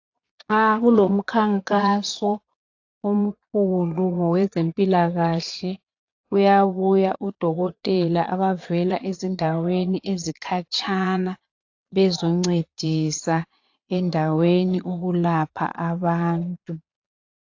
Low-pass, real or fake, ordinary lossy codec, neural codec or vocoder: 7.2 kHz; fake; AAC, 48 kbps; vocoder, 22.05 kHz, 80 mel bands, Vocos